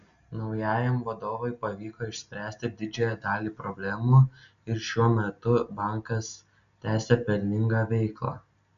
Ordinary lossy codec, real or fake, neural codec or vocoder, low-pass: MP3, 96 kbps; real; none; 7.2 kHz